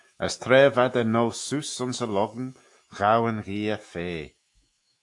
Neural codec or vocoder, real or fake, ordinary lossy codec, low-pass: autoencoder, 48 kHz, 128 numbers a frame, DAC-VAE, trained on Japanese speech; fake; AAC, 64 kbps; 10.8 kHz